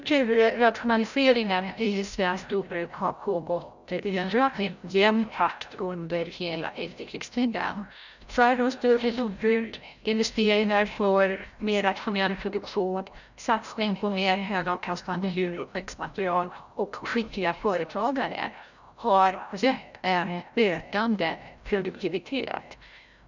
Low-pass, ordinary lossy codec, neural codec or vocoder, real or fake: 7.2 kHz; none; codec, 16 kHz, 0.5 kbps, FreqCodec, larger model; fake